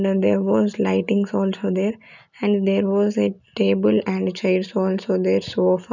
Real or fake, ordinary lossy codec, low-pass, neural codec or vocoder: real; none; 7.2 kHz; none